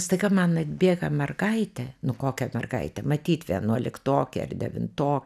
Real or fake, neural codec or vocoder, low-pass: real; none; 14.4 kHz